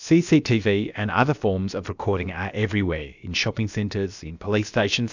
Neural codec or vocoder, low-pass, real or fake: codec, 16 kHz, about 1 kbps, DyCAST, with the encoder's durations; 7.2 kHz; fake